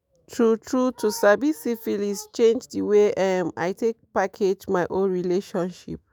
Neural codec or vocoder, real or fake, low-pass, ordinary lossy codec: autoencoder, 48 kHz, 128 numbers a frame, DAC-VAE, trained on Japanese speech; fake; none; none